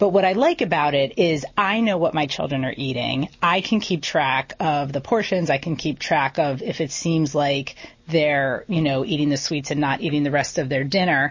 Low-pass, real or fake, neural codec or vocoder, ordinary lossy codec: 7.2 kHz; real; none; MP3, 32 kbps